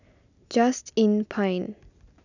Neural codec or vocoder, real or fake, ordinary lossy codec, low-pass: none; real; none; 7.2 kHz